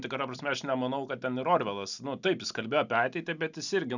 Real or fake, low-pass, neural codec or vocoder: real; 7.2 kHz; none